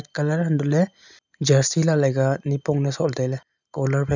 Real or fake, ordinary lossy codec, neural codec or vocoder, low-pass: real; none; none; 7.2 kHz